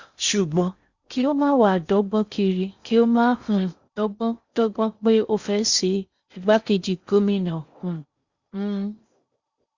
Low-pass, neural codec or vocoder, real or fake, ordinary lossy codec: 7.2 kHz; codec, 16 kHz in and 24 kHz out, 0.6 kbps, FocalCodec, streaming, 4096 codes; fake; Opus, 64 kbps